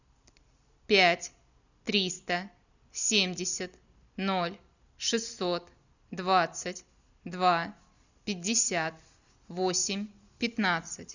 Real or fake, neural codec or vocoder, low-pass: real; none; 7.2 kHz